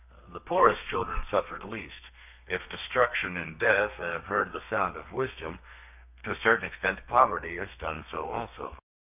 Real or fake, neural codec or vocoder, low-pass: fake; codec, 32 kHz, 1.9 kbps, SNAC; 3.6 kHz